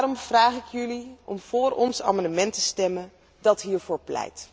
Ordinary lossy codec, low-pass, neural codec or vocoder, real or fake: none; none; none; real